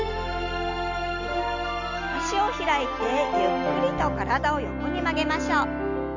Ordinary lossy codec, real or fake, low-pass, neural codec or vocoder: none; real; 7.2 kHz; none